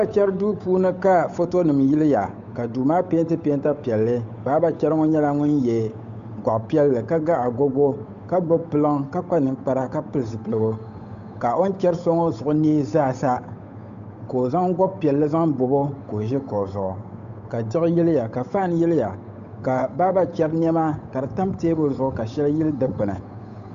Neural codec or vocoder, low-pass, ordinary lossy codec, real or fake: codec, 16 kHz, 8 kbps, FunCodec, trained on Chinese and English, 25 frames a second; 7.2 kHz; AAC, 96 kbps; fake